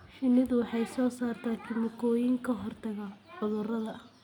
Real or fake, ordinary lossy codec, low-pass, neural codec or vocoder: real; none; 19.8 kHz; none